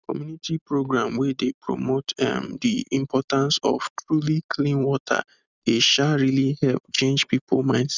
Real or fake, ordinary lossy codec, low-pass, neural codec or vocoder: real; none; 7.2 kHz; none